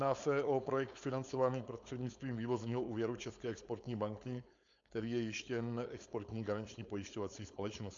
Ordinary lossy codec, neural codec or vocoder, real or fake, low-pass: AAC, 64 kbps; codec, 16 kHz, 4.8 kbps, FACodec; fake; 7.2 kHz